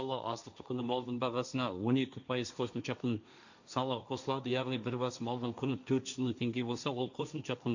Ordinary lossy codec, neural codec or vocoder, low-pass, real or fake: none; codec, 16 kHz, 1.1 kbps, Voila-Tokenizer; 7.2 kHz; fake